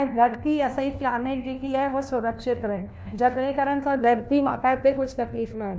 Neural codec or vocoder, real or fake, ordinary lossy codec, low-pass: codec, 16 kHz, 1 kbps, FunCodec, trained on LibriTTS, 50 frames a second; fake; none; none